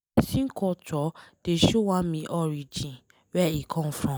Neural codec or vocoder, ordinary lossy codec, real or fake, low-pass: none; none; real; none